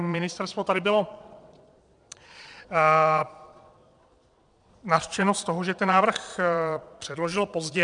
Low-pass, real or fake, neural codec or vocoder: 9.9 kHz; fake; vocoder, 22.05 kHz, 80 mel bands, Vocos